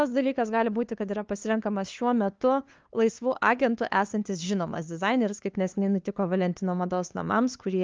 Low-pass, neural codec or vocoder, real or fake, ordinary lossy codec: 7.2 kHz; codec, 16 kHz, 2 kbps, FunCodec, trained on LibriTTS, 25 frames a second; fake; Opus, 24 kbps